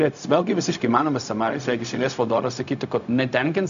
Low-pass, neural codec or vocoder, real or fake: 7.2 kHz; codec, 16 kHz, 0.4 kbps, LongCat-Audio-Codec; fake